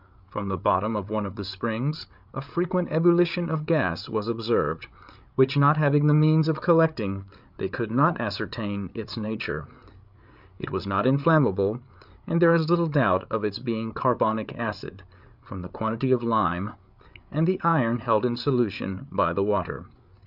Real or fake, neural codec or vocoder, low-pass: fake; codec, 16 kHz, 8 kbps, FreqCodec, larger model; 5.4 kHz